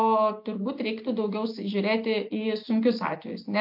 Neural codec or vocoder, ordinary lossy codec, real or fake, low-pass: none; MP3, 48 kbps; real; 5.4 kHz